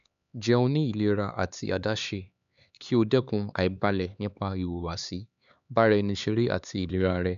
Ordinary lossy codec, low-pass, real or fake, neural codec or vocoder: none; 7.2 kHz; fake; codec, 16 kHz, 4 kbps, X-Codec, HuBERT features, trained on LibriSpeech